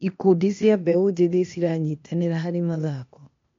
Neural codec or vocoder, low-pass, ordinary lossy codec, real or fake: codec, 16 kHz, 0.8 kbps, ZipCodec; 7.2 kHz; MP3, 48 kbps; fake